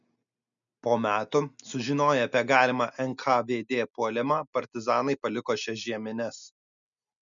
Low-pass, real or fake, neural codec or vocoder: 7.2 kHz; real; none